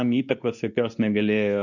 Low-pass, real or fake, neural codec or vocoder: 7.2 kHz; fake; codec, 24 kHz, 0.9 kbps, WavTokenizer, medium speech release version 2